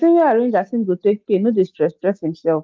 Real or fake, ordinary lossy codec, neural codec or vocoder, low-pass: real; Opus, 24 kbps; none; 7.2 kHz